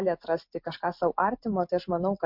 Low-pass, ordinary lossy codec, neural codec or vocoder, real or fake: 5.4 kHz; MP3, 48 kbps; none; real